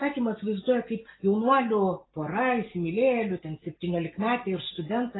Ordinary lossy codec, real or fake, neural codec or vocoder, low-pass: AAC, 16 kbps; real; none; 7.2 kHz